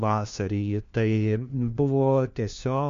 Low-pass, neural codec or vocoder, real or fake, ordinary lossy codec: 7.2 kHz; codec, 16 kHz, 1 kbps, FunCodec, trained on LibriTTS, 50 frames a second; fake; MP3, 64 kbps